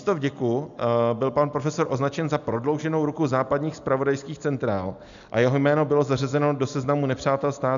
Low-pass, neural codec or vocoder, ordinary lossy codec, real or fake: 7.2 kHz; none; MP3, 96 kbps; real